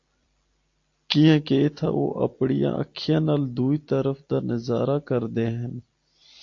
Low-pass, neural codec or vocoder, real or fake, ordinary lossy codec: 7.2 kHz; none; real; AAC, 48 kbps